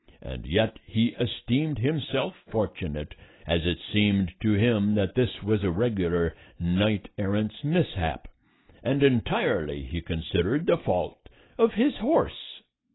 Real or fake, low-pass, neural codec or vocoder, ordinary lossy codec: real; 7.2 kHz; none; AAC, 16 kbps